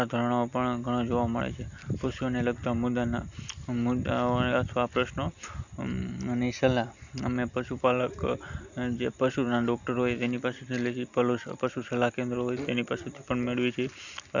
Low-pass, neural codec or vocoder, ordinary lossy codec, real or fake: 7.2 kHz; none; none; real